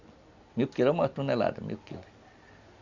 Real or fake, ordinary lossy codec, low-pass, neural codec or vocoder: real; Opus, 64 kbps; 7.2 kHz; none